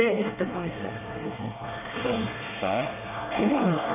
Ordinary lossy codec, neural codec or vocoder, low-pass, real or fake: none; codec, 24 kHz, 1 kbps, SNAC; 3.6 kHz; fake